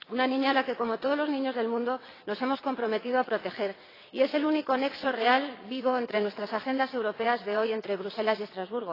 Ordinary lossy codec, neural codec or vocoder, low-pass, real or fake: AAC, 24 kbps; vocoder, 22.05 kHz, 80 mel bands, WaveNeXt; 5.4 kHz; fake